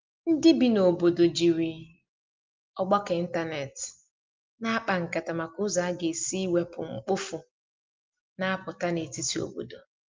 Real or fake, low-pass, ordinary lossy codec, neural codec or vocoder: real; 7.2 kHz; Opus, 24 kbps; none